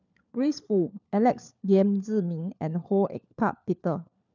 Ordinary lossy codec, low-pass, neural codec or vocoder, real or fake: none; 7.2 kHz; codec, 16 kHz, 4 kbps, FunCodec, trained on LibriTTS, 50 frames a second; fake